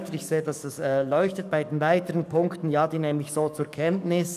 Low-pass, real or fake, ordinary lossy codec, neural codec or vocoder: 14.4 kHz; fake; none; codec, 44.1 kHz, 7.8 kbps, DAC